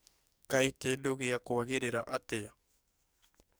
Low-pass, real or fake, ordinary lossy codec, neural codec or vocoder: none; fake; none; codec, 44.1 kHz, 2.6 kbps, SNAC